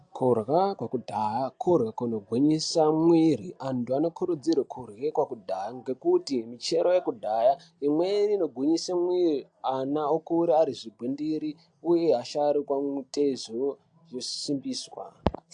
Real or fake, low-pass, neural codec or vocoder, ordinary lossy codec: real; 9.9 kHz; none; AAC, 64 kbps